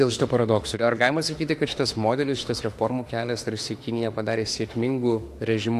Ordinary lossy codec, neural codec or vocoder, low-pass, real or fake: AAC, 64 kbps; autoencoder, 48 kHz, 32 numbers a frame, DAC-VAE, trained on Japanese speech; 14.4 kHz; fake